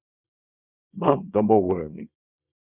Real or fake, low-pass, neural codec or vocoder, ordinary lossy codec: fake; 3.6 kHz; codec, 24 kHz, 0.9 kbps, WavTokenizer, small release; Opus, 64 kbps